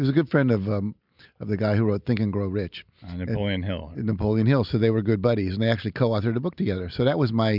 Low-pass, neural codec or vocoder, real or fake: 5.4 kHz; none; real